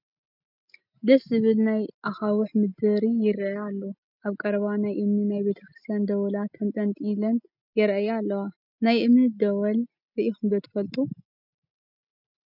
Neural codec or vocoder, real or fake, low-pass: none; real; 5.4 kHz